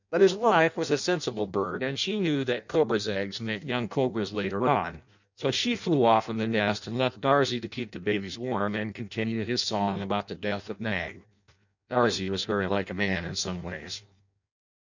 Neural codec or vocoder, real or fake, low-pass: codec, 16 kHz in and 24 kHz out, 0.6 kbps, FireRedTTS-2 codec; fake; 7.2 kHz